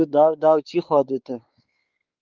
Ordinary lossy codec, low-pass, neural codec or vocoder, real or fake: Opus, 32 kbps; 7.2 kHz; codec, 16 kHz, 4 kbps, X-Codec, WavLM features, trained on Multilingual LibriSpeech; fake